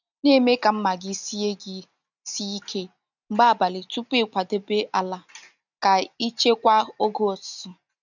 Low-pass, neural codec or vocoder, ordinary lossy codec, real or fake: 7.2 kHz; none; none; real